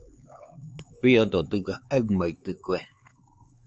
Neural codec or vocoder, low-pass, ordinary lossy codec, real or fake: codec, 16 kHz, 4 kbps, X-Codec, HuBERT features, trained on LibriSpeech; 7.2 kHz; Opus, 24 kbps; fake